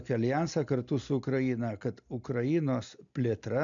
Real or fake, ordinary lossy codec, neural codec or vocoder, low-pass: real; MP3, 96 kbps; none; 7.2 kHz